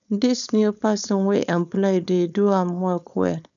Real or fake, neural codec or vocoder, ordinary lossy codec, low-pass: fake; codec, 16 kHz, 4.8 kbps, FACodec; none; 7.2 kHz